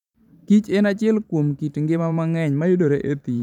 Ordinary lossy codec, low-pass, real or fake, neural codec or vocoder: none; 19.8 kHz; fake; vocoder, 44.1 kHz, 128 mel bands every 512 samples, BigVGAN v2